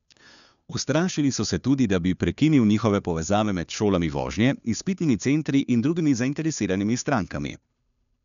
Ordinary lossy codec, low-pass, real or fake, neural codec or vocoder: none; 7.2 kHz; fake; codec, 16 kHz, 2 kbps, FunCodec, trained on Chinese and English, 25 frames a second